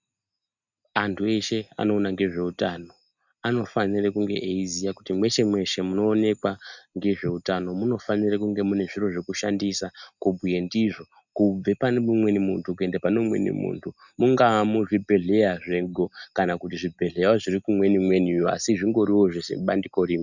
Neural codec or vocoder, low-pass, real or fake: none; 7.2 kHz; real